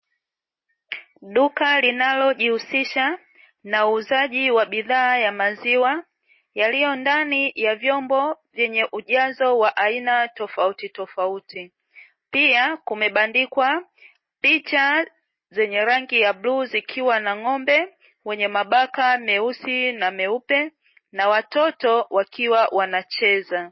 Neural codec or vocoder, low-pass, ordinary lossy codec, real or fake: none; 7.2 kHz; MP3, 24 kbps; real